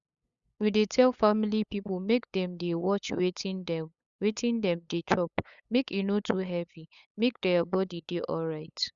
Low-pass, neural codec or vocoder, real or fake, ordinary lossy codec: 7.2 kHz; codec, 16 kHz, 8 kbps, FunCodec, trained on LibriTTS, 25 frames a second; fake; Opus, 64 kbps